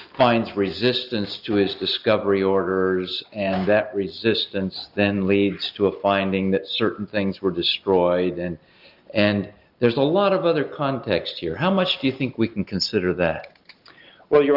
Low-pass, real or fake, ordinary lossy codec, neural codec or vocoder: 5.4 kHz; real; Opus, 24 kbps; none